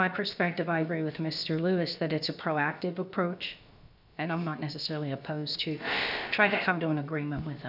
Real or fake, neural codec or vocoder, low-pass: fake; codec, 16 kHz, about 1 kbps, DyCAST, with the encoder's durations; 5.4 kHz